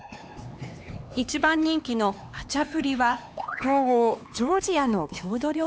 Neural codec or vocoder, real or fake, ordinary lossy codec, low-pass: codec, 16 kHz, 2 kbps, X-Codec, HuBERT features, trained on LibriSpeech; fake; none; none